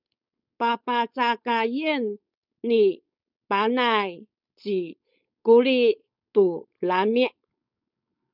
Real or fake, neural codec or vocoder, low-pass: fake; codec, 16 kHz, 4.8 kbps, FACodec; 5.4 kHz